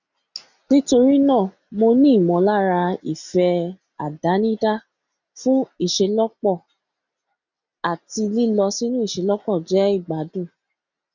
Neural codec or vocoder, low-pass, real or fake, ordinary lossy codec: none; 7.2 kHz; real; none